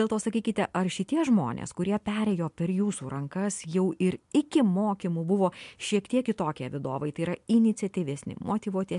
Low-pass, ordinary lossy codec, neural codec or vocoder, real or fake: 10.8 kHz; MP3, 64 kbps; none; real